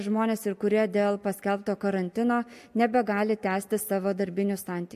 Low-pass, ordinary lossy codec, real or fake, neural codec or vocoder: 14.4 kHz; MP3, 64 kbps; real; none